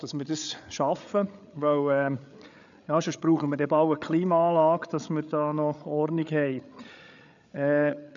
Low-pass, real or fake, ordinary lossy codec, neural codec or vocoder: 7.2 kHz; fake; none; codec, 16 kHz, 8 kbps, FreqCodec, larger model